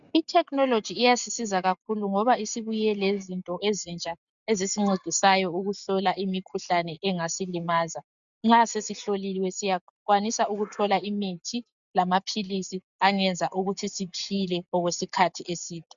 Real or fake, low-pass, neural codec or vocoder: real; 7.2 kHz; none